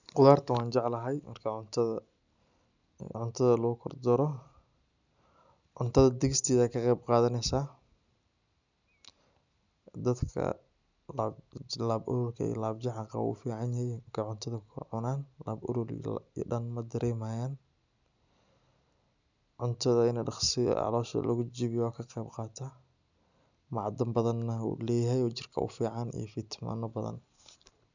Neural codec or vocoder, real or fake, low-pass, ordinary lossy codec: none; real; 7.2 kHz; none